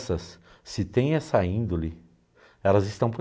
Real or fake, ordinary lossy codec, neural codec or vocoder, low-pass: real; none; none; none